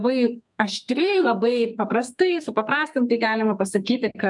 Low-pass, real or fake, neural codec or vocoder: 10.8 kHz; fake; codec, 32 kHz, 1.9 kbps, SNAC